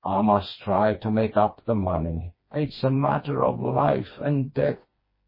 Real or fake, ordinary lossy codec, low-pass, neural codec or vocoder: fake; MP3, 24 kbps; 5.4 kHz; codec, 16 kHz, 2 kbps, FreqCodec, smaller model